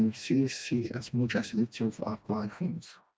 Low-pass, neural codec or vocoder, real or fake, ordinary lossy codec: none; codec, 16 kHz, 1 kbps, FreqCodec, smaller model; fake; none